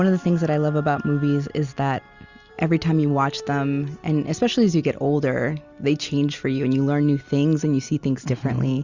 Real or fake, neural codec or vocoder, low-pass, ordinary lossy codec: real; none; 7.2 kHz; Opus, 64 kbps